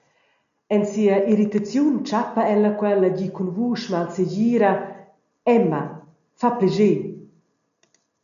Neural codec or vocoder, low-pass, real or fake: none; 7.2 kHz; real